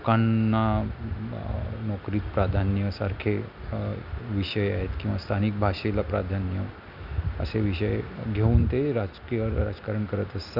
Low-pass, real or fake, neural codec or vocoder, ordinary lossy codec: 5.4 kHz; real; none; none